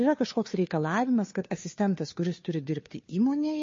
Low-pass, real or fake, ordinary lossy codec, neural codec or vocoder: 7.2 kHz; fake; MP3, 32 kbps; codec, 16 kHz, 2 kbps, FunCodec, trained on Chinese and English, 25 frames a second